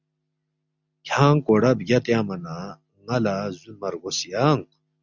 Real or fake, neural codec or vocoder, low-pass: real; none; 7.2 kHz